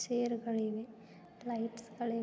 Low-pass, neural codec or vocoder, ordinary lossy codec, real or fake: none; none; none; real